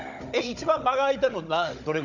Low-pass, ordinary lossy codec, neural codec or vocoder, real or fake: 7.2 kHz; none; codec, 16 kHz, 16 kbps, FunCodec, trained on Chinese and English, 50 frames a second; fake